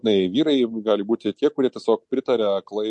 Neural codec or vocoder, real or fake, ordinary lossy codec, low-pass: none; real; MP3, 48 kbps; 10.8 kHz